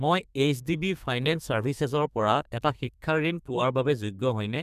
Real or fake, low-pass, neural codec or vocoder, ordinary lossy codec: fake; 14.4 kHz; codec, 32 kHz, 1.9 kbps, SNAC; none